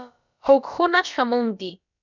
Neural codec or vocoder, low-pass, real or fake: codec, 16 kHz, about 1 kbps, DyCAST, with the encoder's durations; 7.2 kHz; fake